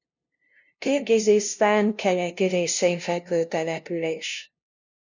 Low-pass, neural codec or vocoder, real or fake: 7.2 kHz; codec, 16 kHz, 0.5 kbps, FunCodec, trained on LibriTTS, 25 frames a second; fake